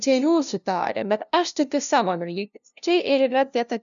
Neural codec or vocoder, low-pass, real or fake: codec, 16 kHz, 0.5 kbps, FunCodec, trained on LibriTTS, 25 frames a second; 7.2 kHz; fake